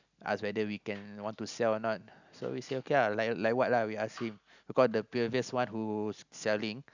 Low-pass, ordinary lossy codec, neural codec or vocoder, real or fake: 7.2 kHz; none; none; real